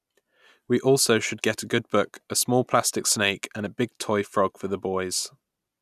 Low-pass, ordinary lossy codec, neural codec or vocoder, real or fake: 14.4 kHz; none; none; real